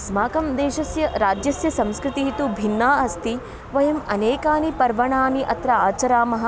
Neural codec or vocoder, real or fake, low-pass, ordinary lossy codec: none; real; none; none